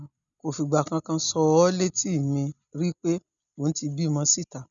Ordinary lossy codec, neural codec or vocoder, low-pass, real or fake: none; none; 7.2 kHz; real